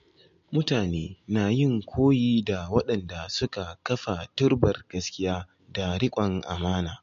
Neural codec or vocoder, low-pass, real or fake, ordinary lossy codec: none; 7.2 kHz; real; MP3, 48 kbps